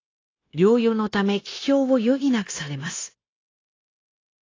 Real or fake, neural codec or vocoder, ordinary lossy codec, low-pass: fake; codec, 16 kHz, 0.9 kbps, LongCat-Audio-Codec; AAC, 32 kbps; 7.2 kHz